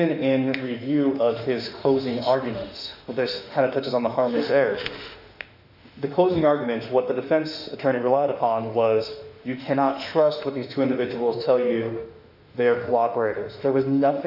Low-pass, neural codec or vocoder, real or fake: 5.4 kHz; autoencoder, 48 kHz, 32 numbers a frame, DAC-VAE, trained on Japanese speech; fake